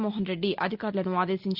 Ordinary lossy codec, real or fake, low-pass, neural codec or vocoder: Opus, 32 kbps; real; 5.4 kHz; none